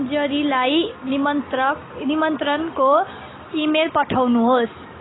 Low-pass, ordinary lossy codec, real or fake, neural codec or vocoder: 7.2 kHz; AAC, 16 kbps; real; none